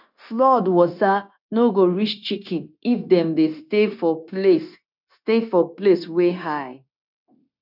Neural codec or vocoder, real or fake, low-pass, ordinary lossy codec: codec, 16 kHz, 0.9 kbps, LongCat-Audio-Codec; fake; 5.4 kHz; none